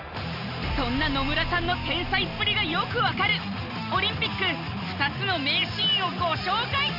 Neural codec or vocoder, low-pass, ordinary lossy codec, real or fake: none; 5.4 kHz; none; real